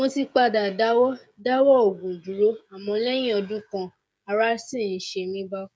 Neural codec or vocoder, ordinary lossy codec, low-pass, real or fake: codec, 16 kHz, 16 kbps, FreqCodec, smaller model; none; none; fake